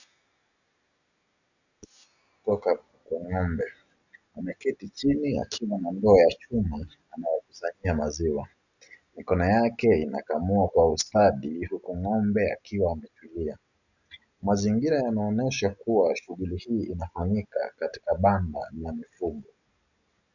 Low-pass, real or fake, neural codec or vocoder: 7.2 kHz; real; none